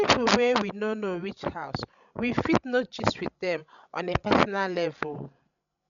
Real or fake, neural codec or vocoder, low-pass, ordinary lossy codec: fake; codec, 16 kHz, 16 kbps, FreqCodec, larger model; 7.2 kHz; none